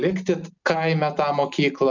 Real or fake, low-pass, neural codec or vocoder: real; 7.2 kHz; none